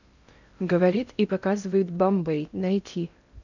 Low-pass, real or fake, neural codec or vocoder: 7.2 kHz; fake; codec, 16 kHz in and 24 kHz out, 0.6 kbps, FocalCodec, streaming, 2048 codes